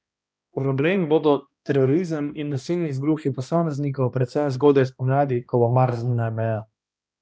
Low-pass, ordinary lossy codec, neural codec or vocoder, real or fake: none; none; codec, 16 kHz, 1 kbps, X-Codec, HuBERT features, trained on balanced general audio; fake